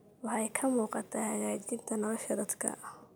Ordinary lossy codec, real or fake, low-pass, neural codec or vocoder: none; real; none; none